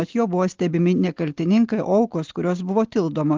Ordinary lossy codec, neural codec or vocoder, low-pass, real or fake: Opus, 16 kbps; none; 7.2 kHz; real